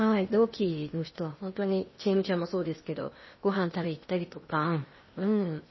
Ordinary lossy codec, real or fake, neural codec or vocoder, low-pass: MP3, 24 kbps; fake; codec, 16 kHz in and 24 kHz out, 0.8 kbps, FocalCodec, streaming, 65536 codes; 7.2 kHz